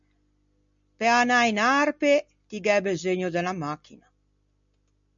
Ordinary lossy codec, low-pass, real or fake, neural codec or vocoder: MP3, 64 kbps; 7.2 kHz; real; none